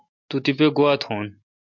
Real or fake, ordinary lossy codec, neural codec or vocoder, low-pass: real; MP3, 48 kbps; none; 7.2 kHz